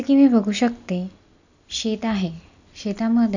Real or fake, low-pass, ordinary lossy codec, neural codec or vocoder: real; 7.2 kHz; none; none